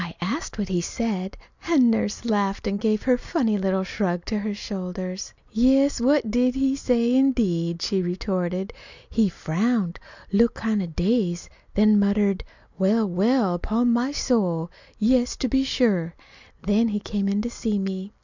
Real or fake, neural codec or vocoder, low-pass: real; none; 7.2 kHz